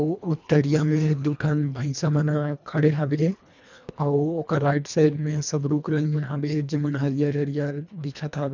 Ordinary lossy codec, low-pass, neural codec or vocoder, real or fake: none; 7.2 kHz; codec, 24 kHz, 1.5 kbps, HILCodec; fake